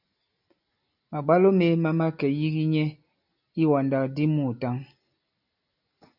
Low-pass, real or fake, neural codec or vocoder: 5.4 kHz; real; none